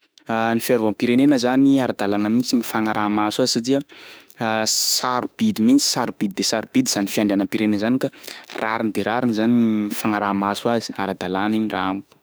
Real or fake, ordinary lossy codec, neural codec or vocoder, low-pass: fake; none; autoencoder, 48 kHz, 32 numbers a frame, DAC-VAE, trained on Japanese speech; none